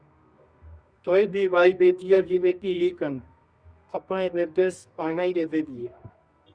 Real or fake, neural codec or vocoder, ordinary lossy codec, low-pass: fake; codec, 24 kHz, 0.9 kbps, WavTokenizer, medium music audio release; Opus, 64 kbps; 9.9 kHz